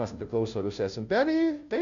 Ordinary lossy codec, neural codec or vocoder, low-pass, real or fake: AAC, 64 kbps; codec, 16 kHz, 0.5 kbps, FunCodec, trained on Chinese and English, 25 frames a second; 7.2 kHz; fake